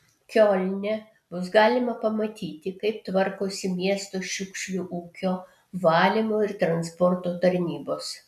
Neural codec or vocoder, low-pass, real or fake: none; 14.4 kHz; real